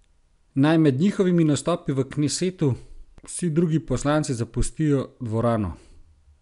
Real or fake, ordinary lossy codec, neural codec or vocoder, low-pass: real; none; none; 10.8 kHz